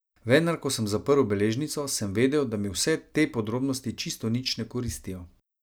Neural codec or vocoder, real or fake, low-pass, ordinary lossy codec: none; real; none; none